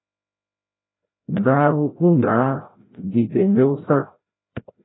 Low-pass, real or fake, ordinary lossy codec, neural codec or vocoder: 7.2 kHz; fake; AAC, 16 kbps; codec, 16 kHz, 0.5 kbps, FreqCodec, larger model